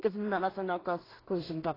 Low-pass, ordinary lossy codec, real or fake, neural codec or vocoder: 5.4 kHz; AAC, 24 kbps; fake; codec, 16 kHz in and 24 kHz out, 0.4 kbps, LongCat-Audio-Codec, two codebook decoder